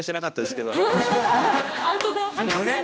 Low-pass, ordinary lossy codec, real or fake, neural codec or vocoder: none; none; fake; codec, 16 kHz, 1 kbps, X-Codec, HuBERT features, trained on general audio